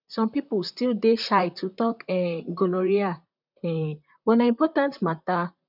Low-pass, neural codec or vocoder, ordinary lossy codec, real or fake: 5.4 kHz; vocoder, 44.1 kHz, 128 mel bands, Pupu-Vocoder; none; fake